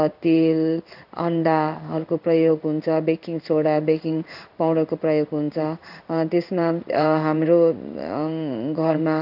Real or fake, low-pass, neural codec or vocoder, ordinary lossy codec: fake; 5.4 kHz; codec, 16 kHz in and 24 kHz out, 1 kbps, XY-Tokenizer; none